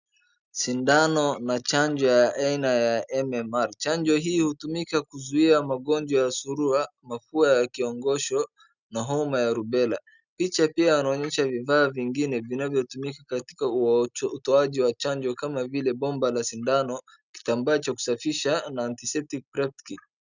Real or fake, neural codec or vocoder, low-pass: real; none; 7.2 kHz